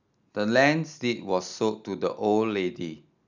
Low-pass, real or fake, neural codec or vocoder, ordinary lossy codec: 7.2 kHz; real; none; none